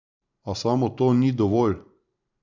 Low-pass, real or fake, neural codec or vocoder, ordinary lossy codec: 7.2 kHz; fake; vocoder, 44.1 kHz, 128 mel bands every 512 samples, BigVGAN v2; none